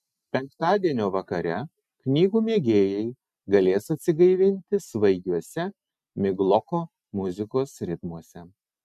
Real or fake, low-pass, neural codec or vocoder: real; 14.4 kHz; none